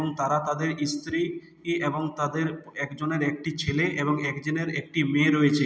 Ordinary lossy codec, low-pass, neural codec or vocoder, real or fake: none; none; none; real